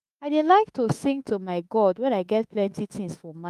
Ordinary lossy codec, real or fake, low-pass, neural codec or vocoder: Opus, 32 kbps; fake; 14.4 kHz; autoencoder, 48 kHz, 32 numbers a frame, DAC-VAE, trained on Japanese speech